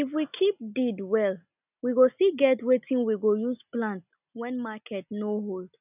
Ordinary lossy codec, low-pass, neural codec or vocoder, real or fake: none; 3.6 kHz; none; real